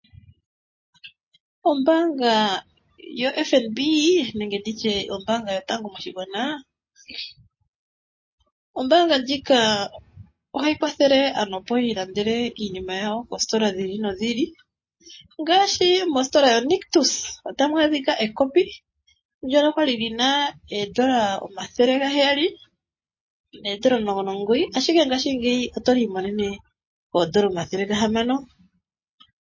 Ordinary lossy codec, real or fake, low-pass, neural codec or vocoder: MP3, 32 kbps; real; 7.2 kHz; none